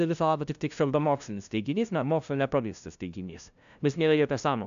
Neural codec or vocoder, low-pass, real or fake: codec, 16 kHz, 0.5 kbps, FunCodec, trained on LibriTTS, 25 frames a second; 7.2 kHz; fake